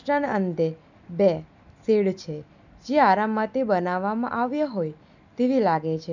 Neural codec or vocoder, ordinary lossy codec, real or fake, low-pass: none; none; real; 7.2 kHz